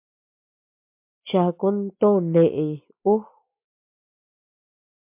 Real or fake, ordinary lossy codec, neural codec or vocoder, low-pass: real; MP3, 32 kbps; none; 3.6 kHz